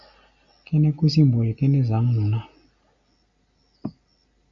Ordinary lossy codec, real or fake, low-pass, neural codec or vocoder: MP3, 48 kbps; real; 7.2 kHz; none